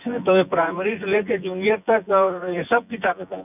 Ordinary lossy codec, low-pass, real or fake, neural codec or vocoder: none; 3.6 kHz; fake; vocoder, 24 kHz, 100 mel bands, Vocos